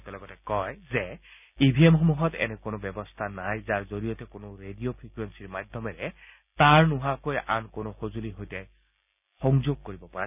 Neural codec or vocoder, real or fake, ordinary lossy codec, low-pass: none; real; none; 3.6 kHz